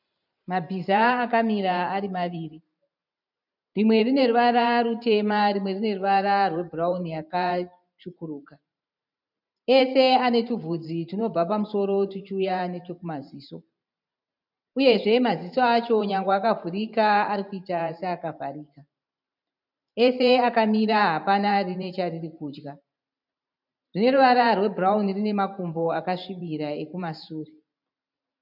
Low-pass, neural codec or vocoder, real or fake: 5.4 kHz; vocoder, 44.1 kHz, 128 mel bands every 512 samples, BigVGAN v2; fake